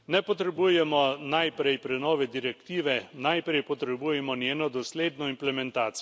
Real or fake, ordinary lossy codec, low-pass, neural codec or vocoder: real; none; none; none